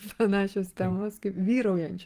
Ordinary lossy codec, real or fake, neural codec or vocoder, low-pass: Opus, 32 kbps; fake; vocoder, 44.1 kHz, 128 mel bands every 512 samples, BigVGAN v2; 14.4 kHz